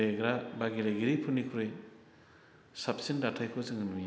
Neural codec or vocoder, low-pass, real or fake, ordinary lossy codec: none; none; real; none